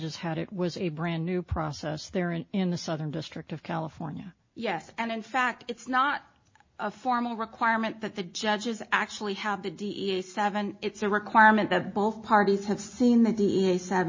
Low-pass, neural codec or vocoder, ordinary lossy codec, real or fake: 7.2 kHz; none; MP3, 32 kbps; real